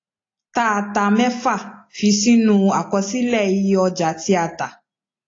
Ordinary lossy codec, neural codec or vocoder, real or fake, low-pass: AAC, 32 kbps; none; real; 7.2 kHz